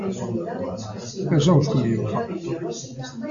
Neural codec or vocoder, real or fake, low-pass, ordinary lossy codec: none; real; 7.2 kHz; AAC, 64 kbps